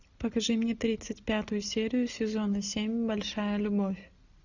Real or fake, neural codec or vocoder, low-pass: real; none; 7.2 kHz